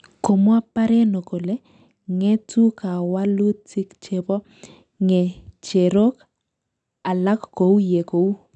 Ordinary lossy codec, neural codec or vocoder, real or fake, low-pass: none; none; real; 9.9 kHz